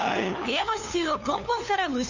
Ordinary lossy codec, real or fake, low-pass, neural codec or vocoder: none; fake; 7.2 kHz; codec, 16 kHz, 2 kbps, FunCodec, trained on LibriTTS, 25 frames a second